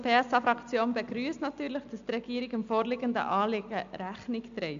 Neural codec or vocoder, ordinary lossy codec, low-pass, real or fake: none; MP3, 64 kbps; 7.2 kHz; real